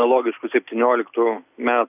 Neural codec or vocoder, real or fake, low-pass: none; real; 3.6 kHz